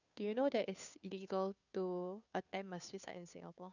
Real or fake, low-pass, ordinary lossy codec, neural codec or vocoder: fake; 7.2 kHz; none; codec, 16 kHz, 2 kbps, FunCodec, trained on Chinese and English, 25 frames a second